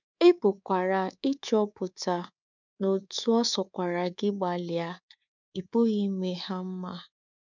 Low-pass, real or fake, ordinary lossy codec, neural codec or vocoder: 7.2 kHz; fake; none; codec, 24 kHz, 3.1 kbps, DualCodec